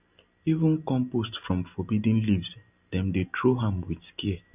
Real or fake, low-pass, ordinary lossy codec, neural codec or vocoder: real; 3.6 kHz; none; none